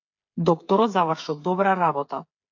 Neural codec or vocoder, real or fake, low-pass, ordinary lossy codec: codec, 16 kHz, 8 kbps, FreqCodec, smaller model; fake; 7.2 kHz; AAC, 48 kbps